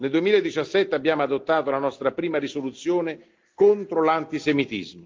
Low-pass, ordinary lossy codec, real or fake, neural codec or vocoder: 7.2 kHz; Opus, 24 kbps; real; none